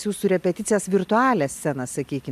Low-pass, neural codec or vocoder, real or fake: 14.4 kHz; none; real